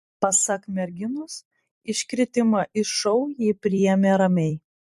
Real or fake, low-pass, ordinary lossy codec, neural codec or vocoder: fake; 14.4 kHz; MP3, 64 kbps; vocoder, 44.1 kHz, 128 mel bands every 256 samples, BigVGAN v2